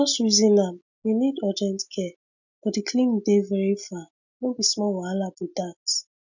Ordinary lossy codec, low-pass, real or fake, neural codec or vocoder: none; 7.2 kHz; real; none